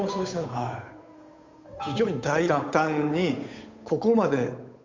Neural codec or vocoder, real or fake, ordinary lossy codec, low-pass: codec, 16 kHz, 8 kbps, FunCodec, trained on Chinese and English, 25 frames a second; fake; MP3, 64 kbps; 7.2 kHz